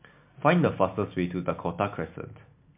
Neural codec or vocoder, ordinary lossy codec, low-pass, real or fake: none; MP3, 32 kbps; 3.6 kHz; real